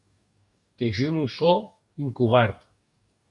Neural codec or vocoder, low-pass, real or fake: codec, 44.1 kHz, 2.6 kbps, DAC; 10.8 kHz; fake